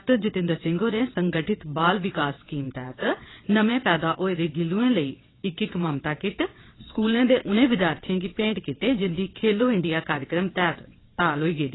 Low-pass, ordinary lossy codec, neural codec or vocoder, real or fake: 7.2 kHz; AAC, 16 kbps; vocoder, 22.05 kHz, 80 mel bands, WaveNeXt; fake